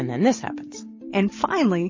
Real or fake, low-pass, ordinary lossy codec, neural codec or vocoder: real; 7.2 kHz; MP3, 32 kbps; none